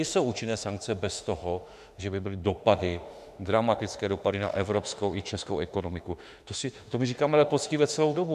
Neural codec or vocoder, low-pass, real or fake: autoencoder, 48 kHz, 32 numbers a frame, DAC-VAE, trained on Japanese speech; 14.4 kHz; fake